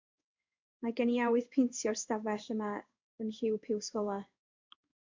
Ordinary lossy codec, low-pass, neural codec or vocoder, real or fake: MP3, 64 kbps; 7.2 kHz; codec, 16 kHz in and 24 kHz out, 1 kbps, XY-Tokenizer; fake